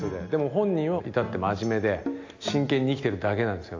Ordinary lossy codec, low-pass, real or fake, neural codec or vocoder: AAC, 48 kbps; 7.2 kHz; real; none